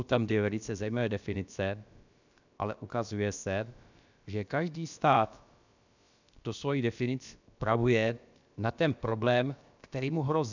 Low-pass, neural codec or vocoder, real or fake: 7.2 kHz; codec, 16 kHz, about 1 kbps, DyCAST, with the encoder's durations; fake